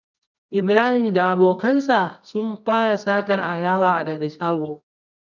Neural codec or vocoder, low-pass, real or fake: codec, 24 kHz, 0.9 kbps, WavTokenizer, medium music audio release; 7.2 kHz; fake